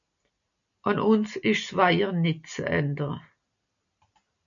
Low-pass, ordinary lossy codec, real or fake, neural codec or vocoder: 7.2 kHz; MP3, 96 kbps; real; none